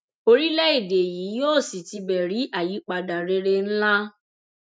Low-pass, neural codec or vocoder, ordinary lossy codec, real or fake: none; none; none; real